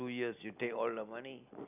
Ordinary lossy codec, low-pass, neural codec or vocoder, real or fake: none; 3.6 kHz; none; real